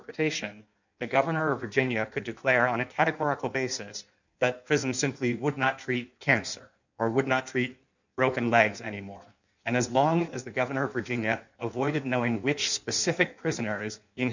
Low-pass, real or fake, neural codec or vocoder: 7.2 kHz; fake; codec, 16 kHz in and 24 kHz out, 1.1 kbps, FireRedTTS-2 codec